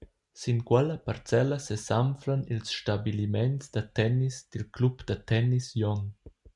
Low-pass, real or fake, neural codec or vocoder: 10.8 kHz; real; none